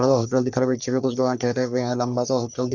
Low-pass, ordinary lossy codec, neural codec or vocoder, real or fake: 7.2 kHz; Opus, 64 kbps; codec, 44.1 kHz, 3.4 kbps, Pupu-Codec; fake